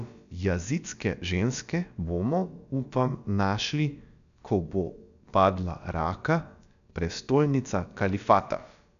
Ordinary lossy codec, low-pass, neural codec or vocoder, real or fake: none; 7.2 kHz; codec, 16 kHz, about 1 kbps, DyCAST, with the encoder's durations; fake